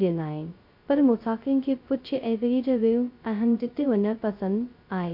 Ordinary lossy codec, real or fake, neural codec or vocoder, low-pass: MP3, 48 kbps; fake; codec, 16 kHz, 0.2 kbps, FocalCodec; 5.4 kHz